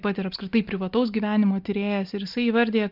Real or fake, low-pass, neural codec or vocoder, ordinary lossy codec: real; 5.4 kHz; none; Opus, 24 kbps